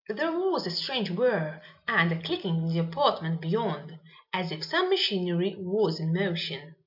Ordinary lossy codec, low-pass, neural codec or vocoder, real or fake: AAC, 48 kbps; 5.4 kHz; none; real